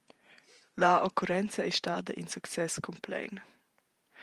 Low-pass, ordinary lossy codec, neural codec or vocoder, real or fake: 14.4 kHz; Opus, 32 kbps; vocoder, 48 kHz, 128 mel bands, Vocos; fake